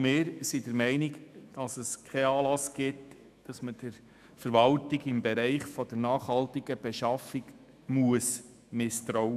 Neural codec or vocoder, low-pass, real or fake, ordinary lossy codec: autoencoder, 48 kHz, 128 numbers a frame, DAC-VAE, trained on Japanese speech; 14.4 kHz; fake; none